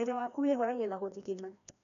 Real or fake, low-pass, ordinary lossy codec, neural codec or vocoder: fake; 7.2 kHz; none; codec, 16 kHz, 1 kbps, FreqCodec, larger model